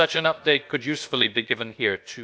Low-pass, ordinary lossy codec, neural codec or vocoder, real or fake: none; none; codec, 16 kHz, about 1 kbps, DyCAST, with the encoder's durations; fake